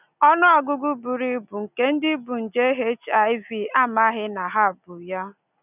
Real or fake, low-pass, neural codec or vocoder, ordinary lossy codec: real; 3.6 kHz; none; none